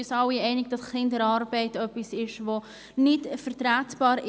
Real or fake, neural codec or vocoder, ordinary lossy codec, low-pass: real; none; none; none